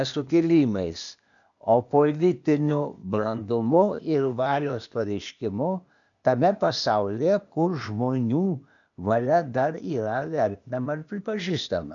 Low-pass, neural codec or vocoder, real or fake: 7.2 kHz; codec, 16 kHz, 0.8 kbps, ZipCodec; fake